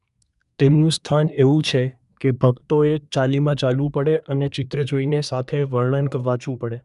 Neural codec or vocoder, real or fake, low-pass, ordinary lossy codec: codec, 24 kHz, 1 kbps, SNAC; fake; 10.8 kHz; none